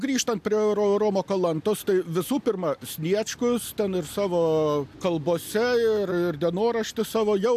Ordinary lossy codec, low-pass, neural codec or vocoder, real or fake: AAC, 96 kbps; 14.4 kHz; none; real